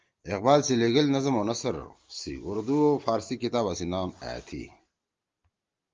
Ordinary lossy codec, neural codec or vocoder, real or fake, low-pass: Opus, 32 kbps; none; real; 7.2 kHz